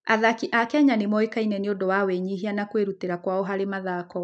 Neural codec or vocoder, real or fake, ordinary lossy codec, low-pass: none; real; none; 9.9 kHz